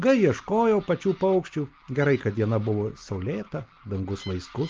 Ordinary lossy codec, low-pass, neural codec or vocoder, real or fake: Opus, 32 kbps; 7.2 kHz; none; real